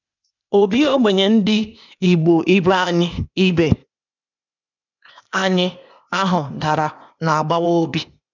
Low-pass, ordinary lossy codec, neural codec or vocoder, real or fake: 7.2 kHz; none; codec, 16 kHz, 0.8 kbps, ZipCodec; fake